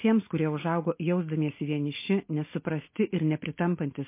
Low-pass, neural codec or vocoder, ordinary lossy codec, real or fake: 3.6 kHz; none; MP3, 24 kbps; real